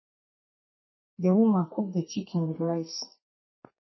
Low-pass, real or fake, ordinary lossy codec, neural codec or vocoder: 7.2 kHz; fake; MP3, 24 kbps; codec, 32 kHz, 1.9 kbps, SNAC